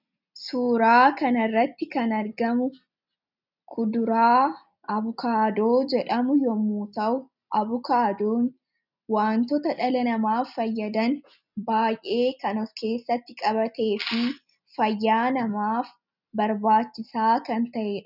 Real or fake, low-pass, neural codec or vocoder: real; 5.4 kHz; none